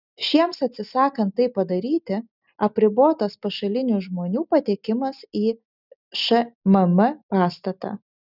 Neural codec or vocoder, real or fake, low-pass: none; real; 5.4 kHz